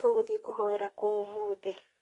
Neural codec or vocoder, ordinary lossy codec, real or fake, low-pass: codec, 24 kHz, 1 kbps, SNAC; AAC, 32 kbps; fake; 10.8 kHz